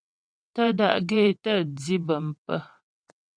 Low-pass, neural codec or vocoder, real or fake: 9.9 kHz; vocoder, 22.05 kHz, 80 mel bands, WaveNeXt; fake